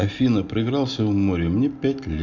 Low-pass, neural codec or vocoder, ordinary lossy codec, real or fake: 7.2 kHz; none; none; real